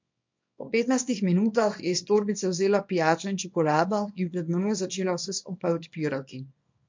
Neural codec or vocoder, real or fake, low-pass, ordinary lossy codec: codec, 24 kHz, 0.9 kbps, WavTokenizer, small release; fake; 7.2 kHz; MP3, 48 kbps